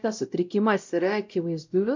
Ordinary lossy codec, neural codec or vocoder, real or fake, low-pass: MP3, 64 kbps; codec, 16 kHz, 0.5 kbps, X-Codec, WavLM features, trained on Multilingual LibriSpeech; fake; 7.2 kHz